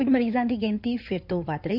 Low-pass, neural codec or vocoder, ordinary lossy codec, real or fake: 5.4 kHz; codec, 16 kHz in and 24 kHz out, 2.2 kbps, FireRedTTS-2 codec; none; fake